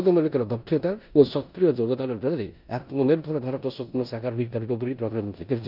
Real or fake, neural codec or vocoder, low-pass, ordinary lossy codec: fake; codec, 16 kHz in and 24 kHz out, 0.9 kbps, LongCat-Audio-Codec, four codebook decoder; 5.4 kHz; none